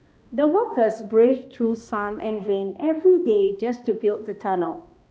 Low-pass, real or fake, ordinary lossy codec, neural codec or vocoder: none; fake; none; codec, 16 kHz, 1 kbps, X-Codec, HuBERT features, trained on balanced general audio